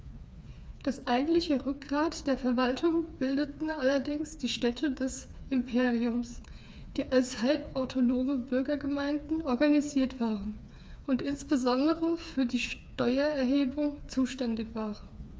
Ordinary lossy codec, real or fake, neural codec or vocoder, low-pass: none; fake; codec, 16 kHz, 4 kbps, FreqCodec, smaller model; none